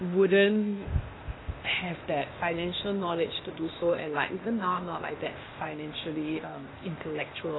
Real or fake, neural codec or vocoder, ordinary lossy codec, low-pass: fake; codec, 16 kHz, 0.8 kbps, ZipCodec; AAC, 16 kbps; 7.2 kHz